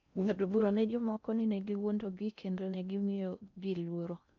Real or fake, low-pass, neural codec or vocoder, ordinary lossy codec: fake; 7.2 kHz; codec, 16 kHz in and 24 kHz out, 0.6 kbps, FocalCodec, streaming, 4096 codes; none